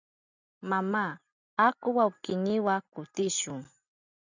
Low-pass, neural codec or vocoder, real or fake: 7.2 kHz; none; real